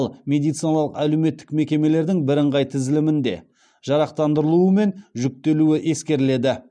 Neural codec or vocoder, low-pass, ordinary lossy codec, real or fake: none; 9.9 kHz; none; real